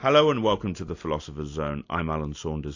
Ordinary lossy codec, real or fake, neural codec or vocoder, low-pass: AAC, 48 kbps; real; none; 7.2 kHz